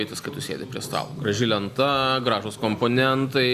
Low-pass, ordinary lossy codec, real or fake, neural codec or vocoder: 14.4 kHz; Opus, 64 kbps; real; none